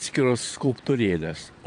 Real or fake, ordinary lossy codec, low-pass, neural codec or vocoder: fake; AAC, 64 kbps; 9.9 kHz; vocoder, 22.05 kHz, 80 mel bands, Vocos